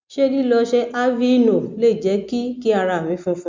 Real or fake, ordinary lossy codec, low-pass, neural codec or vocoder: real; MP3, 64 kbps; 7.2 kHz; none